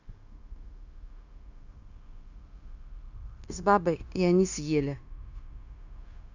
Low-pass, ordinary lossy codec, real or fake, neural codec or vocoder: 7.2 kHz; none; fake; codec, 16 kHz, 0.9 kbps, LongCat-Audio-Codec